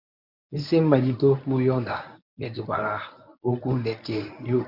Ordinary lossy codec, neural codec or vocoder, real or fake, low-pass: none; codec, 24 kHz, 0.9 kbps, WavTokenizer, medium speech release version 1; fake; 5.4 kHz